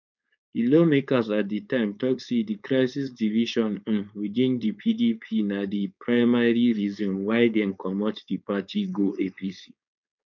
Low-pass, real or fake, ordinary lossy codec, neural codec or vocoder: 7.2 kHz; fake; none; codec, 16 kHz, 4.8 kbps, FACodec